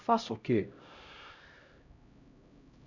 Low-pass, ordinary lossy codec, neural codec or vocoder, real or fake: 7.2 kHz; none; codec, 16 kHz, 0.5 kbps, X-Codec, HuBERT features, trained on LibriSpeech; fake